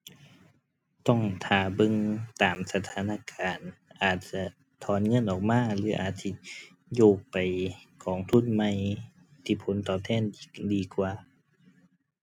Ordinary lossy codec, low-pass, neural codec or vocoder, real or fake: none; 19.8 kHz; none; real